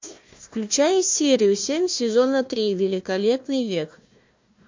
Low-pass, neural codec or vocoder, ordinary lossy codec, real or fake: 7.2 kHz; codec, 16 kHz, 1 kbps, FunCodec, trained on Chinese and English, 50 frames a second; MP3, 48 kbps; fake